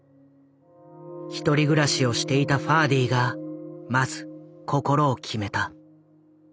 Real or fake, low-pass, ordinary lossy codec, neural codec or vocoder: real; none; none; none